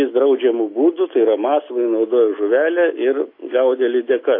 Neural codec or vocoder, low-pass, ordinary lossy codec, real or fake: none; 5.4 kHz; AAC, 32 kbps; real